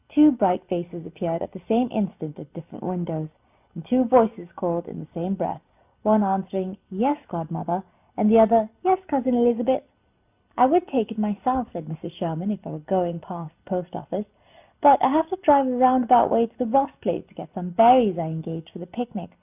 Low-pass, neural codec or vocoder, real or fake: 3.6 kHz; none; real